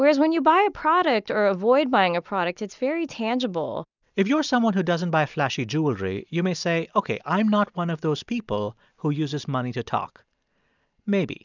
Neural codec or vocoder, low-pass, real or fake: none; 7.2 kHz; real